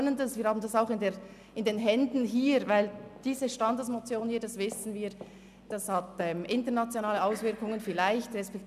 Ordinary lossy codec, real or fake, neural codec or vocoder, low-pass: none; real; none; 14.4 kHz